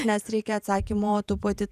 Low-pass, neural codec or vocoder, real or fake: 14.4 kHz; vocoder, 48 kHz, 128 mel bands, Vocos; fake